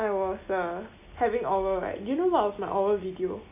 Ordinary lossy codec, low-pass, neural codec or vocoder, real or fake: none; 3.6 kHz; none; real